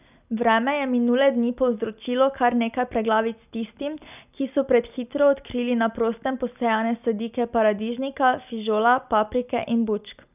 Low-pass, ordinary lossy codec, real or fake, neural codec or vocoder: 3.6 kHz; none; real; none